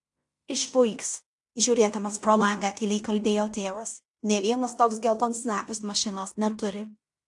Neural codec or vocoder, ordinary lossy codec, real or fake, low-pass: codec, 16 kHz in and 24 kHz out, 0.9 kbps, LongCat-Audio-Codec, fine tuned four codebook decoder; AAC, 64 kbps; fake; 10.8 kHz